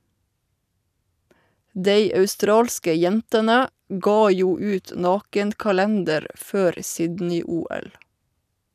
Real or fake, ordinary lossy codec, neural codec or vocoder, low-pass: real; none; none; 14.4 kHz